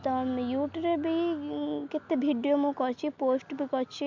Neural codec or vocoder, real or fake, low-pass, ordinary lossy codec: none; real; 7.2 kHz; MP3, 64 kbps